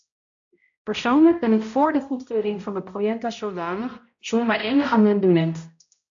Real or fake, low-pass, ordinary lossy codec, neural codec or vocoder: fake; 7.2 kHz; AAC, 64 kbps; codec, 16 kHz, 0.5 kbps, X-Codec, HuBERT features, trained on balanced general audio